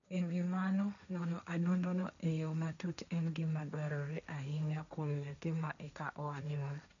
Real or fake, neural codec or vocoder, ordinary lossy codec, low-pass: fake; codec, 16 kHz, 1.1 kbps, Voila-Tokenizer; none; 7.2 kHz